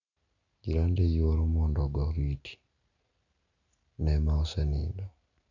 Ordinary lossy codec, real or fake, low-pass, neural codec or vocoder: none; real; 7.2 kHz; none